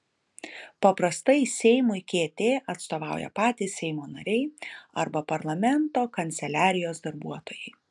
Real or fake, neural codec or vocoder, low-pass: real; none; 10.8 kHz